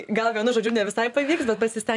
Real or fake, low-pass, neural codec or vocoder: fake; 10.8 kHz; vocoder, 44.1 kHz, 128 mel bands every 512 samples, BigVGAN v2